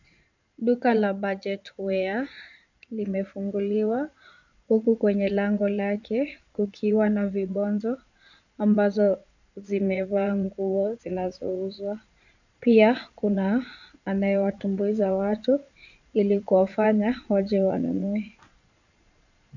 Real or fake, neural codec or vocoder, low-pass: fake; vocoder, 22.05 kHz, 80 mel bands, Vocos; 7.2 kHz